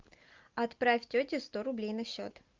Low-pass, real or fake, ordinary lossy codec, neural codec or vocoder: 7.2 kHz; real; Opus, 24 kbps; none